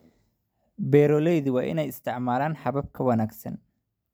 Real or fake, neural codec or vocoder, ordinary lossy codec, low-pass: real; none; none; none